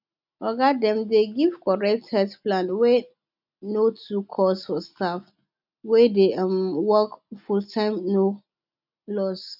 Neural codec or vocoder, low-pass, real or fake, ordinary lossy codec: none; 5.4 kHz; real; none